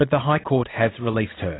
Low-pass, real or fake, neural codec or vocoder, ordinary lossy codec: 7.2 kHz; real; none; AAC, 16 kbps